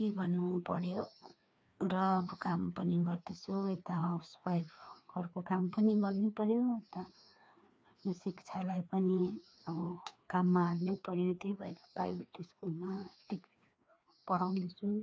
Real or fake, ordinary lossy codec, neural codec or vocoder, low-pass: fake; none; codec, 16 kHz, 2 kbps, FreqCodec, larger model; none